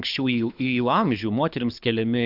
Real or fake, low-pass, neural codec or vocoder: fake; 5.4 kHz; codec, 24 kHz, 6 kbps, HILCodec